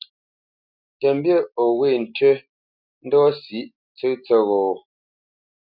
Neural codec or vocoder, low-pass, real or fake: none; 5.4 kHz; real